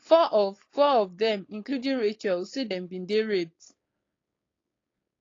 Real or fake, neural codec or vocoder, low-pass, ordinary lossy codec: fake; codec, 16 kHz, 4.8 kbps, FACodec; 7.2 kHz; AAC, 32 kbps